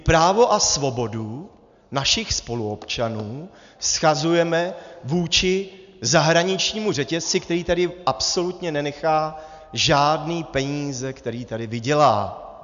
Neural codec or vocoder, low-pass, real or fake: none; 7.2 kHz; real